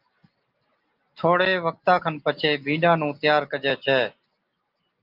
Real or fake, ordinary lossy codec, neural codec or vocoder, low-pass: real; Opus, 24 kbps; none; 5.4 kHz